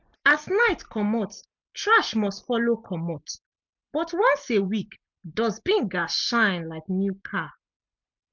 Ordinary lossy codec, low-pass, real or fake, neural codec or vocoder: MP3, 64 kbps; 7.2 kHz; real; none